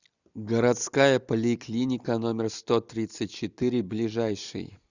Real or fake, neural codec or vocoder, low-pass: real; none; 7.2 kHz